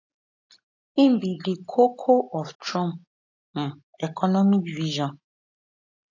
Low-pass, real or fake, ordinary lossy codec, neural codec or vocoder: 7.2 kHz; real; AAC, 48 kbps; none